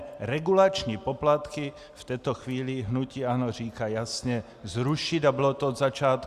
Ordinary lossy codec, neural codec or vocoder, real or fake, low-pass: Opus, 64 kbps; none; real; 14.4 kHz